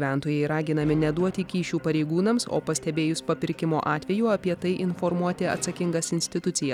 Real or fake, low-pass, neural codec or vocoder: real; 19.8 kHz; none